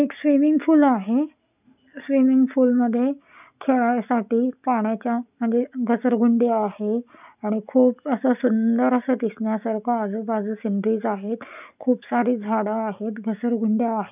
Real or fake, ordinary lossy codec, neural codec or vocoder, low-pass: real; none; none; 3.6 kHz